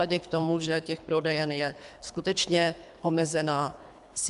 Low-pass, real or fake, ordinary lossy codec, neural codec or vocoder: 10.8 kHz; fake; MP3, 96 kbps; codec, 24 kHz, 3 kbps, HILCodec